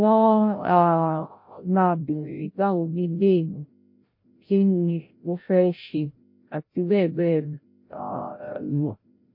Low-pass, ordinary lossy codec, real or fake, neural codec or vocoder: 5.4 kHz; MP3, 32 kbps; fake; codec, 16 kHz, 0.5 kbps, FreqCodec, larger model